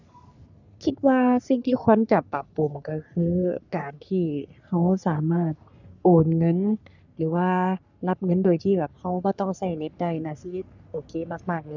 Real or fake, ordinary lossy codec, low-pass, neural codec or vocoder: fake; none; 7.2 kHz; codec, 44.1 kHz, 3.4 kbps, Pupu-Codec